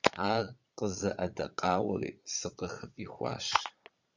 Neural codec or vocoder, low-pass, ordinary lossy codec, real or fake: vocoder, 22.05 kHz, 80 mel bands, Vocos; 7.2 kHz; Opus, 64 kbps; fake